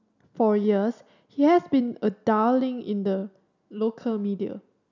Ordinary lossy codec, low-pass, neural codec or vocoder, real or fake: none; 7.2 kHz; none; real